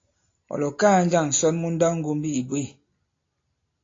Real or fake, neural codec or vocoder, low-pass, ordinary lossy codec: real; none; 7.2 kHz; AAC, 32 kbps